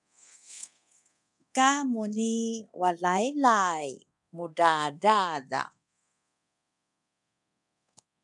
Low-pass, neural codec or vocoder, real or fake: 10.8 kHz; codec, 24 kHz, 0.9 kbps, DualCodec; fake